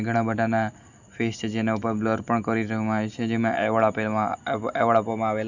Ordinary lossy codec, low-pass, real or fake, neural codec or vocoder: none; 7.2 kHz; real; none